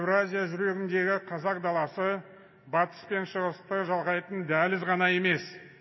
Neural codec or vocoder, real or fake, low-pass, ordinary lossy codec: none; real; 7.2 kHz; MP3, 24 kbps